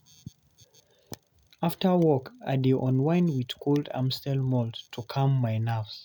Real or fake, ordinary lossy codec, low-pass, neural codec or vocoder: real; none; 19.8 kHz; none